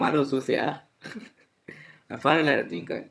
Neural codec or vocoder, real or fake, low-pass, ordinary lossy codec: vocoder, 22.05 kHz, 80 mel bands, HiFi-GAN; fake; none; none